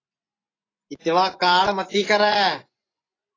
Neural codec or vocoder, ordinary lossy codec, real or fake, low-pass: vocoder, 24 kHz, 100 mel bands, Vocos; AAC, 32 kbps; fake; 7.2 kHz